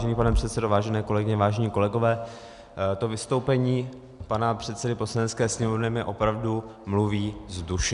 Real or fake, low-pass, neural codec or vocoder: real; 10.8 kHz; none